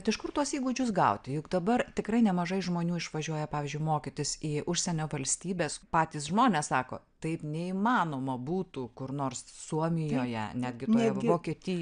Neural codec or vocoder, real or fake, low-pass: none; real; 9.9 kHz